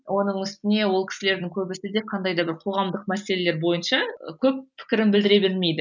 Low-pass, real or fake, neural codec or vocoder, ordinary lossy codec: 7.2 kHz; real; none; none